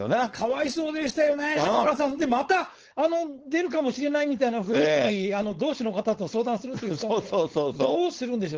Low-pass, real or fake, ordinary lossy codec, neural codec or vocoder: 7.2 kHz; fake; Opus, 16 kbps; codec, 16 kHz, 4.8 kbps, FACodec